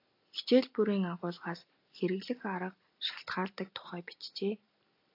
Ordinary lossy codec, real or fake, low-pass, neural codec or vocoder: AAC, 32 kbps; real; 5.4 kHz; none